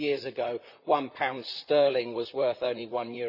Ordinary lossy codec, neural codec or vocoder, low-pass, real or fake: none; vocoder, 44.1 kHz, 128 mel bands, Pupu-Vocoder; 5.4 kHz; fake